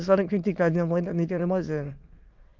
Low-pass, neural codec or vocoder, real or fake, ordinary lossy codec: 7.2 kHz; autoencoder, 22.05 kHz, a latent of 192 numbers a frame, VITS, trained on many speakers; fake; Opus, 32 kbps